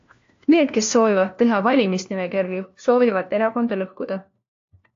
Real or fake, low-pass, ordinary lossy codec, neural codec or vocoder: fake; 7.2 kHz; AAC, 48 kbps; codec, 16 kHz, 1 kbps, FunCodec, trained on LibriTTS, 50 frames a second